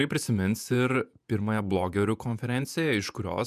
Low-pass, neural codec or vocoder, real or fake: 14.4 kHz; vocoder, 48 kHz, 128 mel bands, Vocos; fake